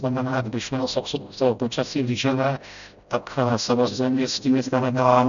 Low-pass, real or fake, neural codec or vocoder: 7.2 kHz; fake; codec, 16 kHz, 0.5 kbps, FreqCodec, smaller model